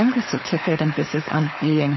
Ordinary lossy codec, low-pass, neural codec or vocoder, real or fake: MP3, 24 kbps; 7.2 kHz; codec, 16 kHz, 4.8 kbps, FACodec; fake